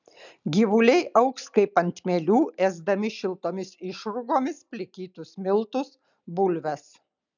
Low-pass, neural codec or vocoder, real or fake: 7.2 kHz; none; real